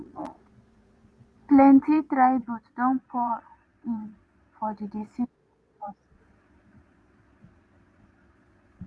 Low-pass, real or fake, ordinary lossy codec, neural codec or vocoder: none; fake; none; vocoder, 22.05 kHz, 80 mel bands, Vocos